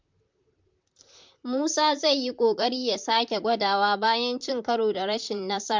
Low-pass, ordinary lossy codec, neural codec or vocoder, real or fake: 7.2 kHz; MP3, 64 kbps; vocoder, 44.1 kHz, 128 mel bands, Pupu-Vocoder; fake